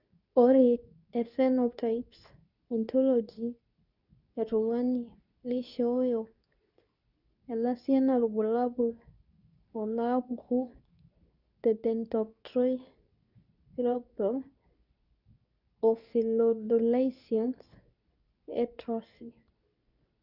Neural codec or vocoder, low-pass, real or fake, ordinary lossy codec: codec, 24 kHz, 0.9 kbps, WavTokenizer, medium speech release version 2; 5.4 kHz; fake; none